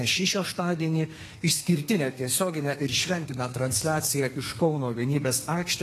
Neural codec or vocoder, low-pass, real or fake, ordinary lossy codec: codec, 32 kHz, 1.9 kbps, SNAC; 14.4 kHz; fake; AAC, 48 kbps